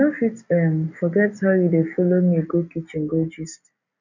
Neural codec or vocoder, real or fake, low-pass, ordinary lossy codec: none; real; 7.2 kHz; none